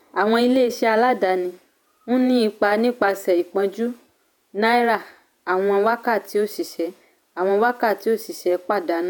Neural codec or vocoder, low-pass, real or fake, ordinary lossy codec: vocoder, 48 kHz, 128 mel bands, Vocos; none; fake; none